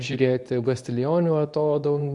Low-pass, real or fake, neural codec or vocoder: 10.8 kHz; fake; codec, 24 kHz, 0.9 kbps, WavTokenizer, medium speech release version 2